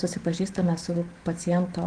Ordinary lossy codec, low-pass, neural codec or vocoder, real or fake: Opus, 16 kbps; 9.9 kHz; autoencoder, 48 kHz, 128 numbers a frame, DAC-VAE, trained on Japanese speech; fake